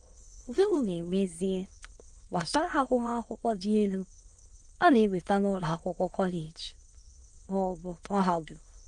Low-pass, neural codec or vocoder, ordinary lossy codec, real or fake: 9.9 kHz; autoencoder, 22.05 kHz, a latent of 192 numbers a frame, VITS, trained on many speakers; Opus, 24 kbps; fake